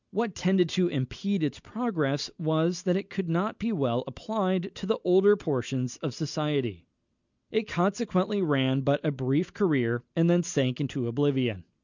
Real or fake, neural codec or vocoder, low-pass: real; none; 7.2 kHz